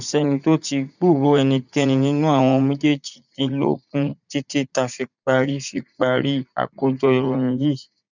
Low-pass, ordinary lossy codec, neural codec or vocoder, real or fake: 7.2 kHz; none; vocoder, 44.1 kHz, 80 mel bands, Vocos; fake